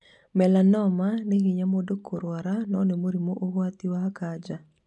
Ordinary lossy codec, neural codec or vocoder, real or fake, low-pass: none; none; real; 10.8 kHz